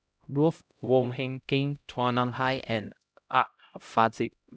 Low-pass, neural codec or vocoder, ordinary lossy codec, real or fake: none; codec, 16 kHz, 0.5 kbps, X-Codec, HuBERT features, trained on LibriSpeech; none; fake